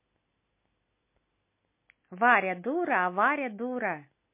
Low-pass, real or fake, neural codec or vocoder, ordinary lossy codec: 3.6 kHz; real; none; MP3, 24 kbps